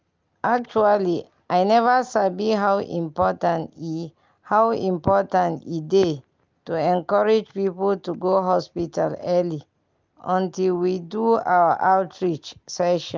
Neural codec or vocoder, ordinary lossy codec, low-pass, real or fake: none; Opus, 24 kbps; 7.2 kHz; real